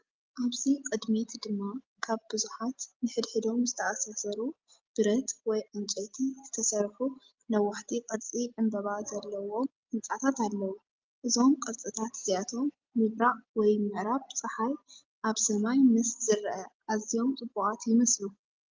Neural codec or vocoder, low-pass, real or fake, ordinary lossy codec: none; 7.2 kHz; real; Opus, 32 kbps